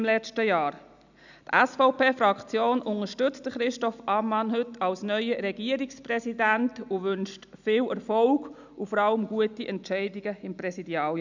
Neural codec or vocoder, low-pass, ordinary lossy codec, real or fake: none; 7.2 kHz; none; real